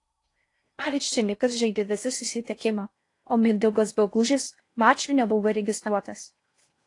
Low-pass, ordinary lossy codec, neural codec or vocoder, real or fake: 10.8 kHz; AAC, 48 kbps; codec, 16 kHz in and 24 kHz out, 0.6 kbps, FocalCodec, streaming, 4096 codes; fake